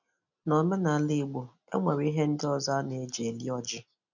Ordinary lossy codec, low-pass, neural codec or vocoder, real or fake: none; 7.2 kHz; none; real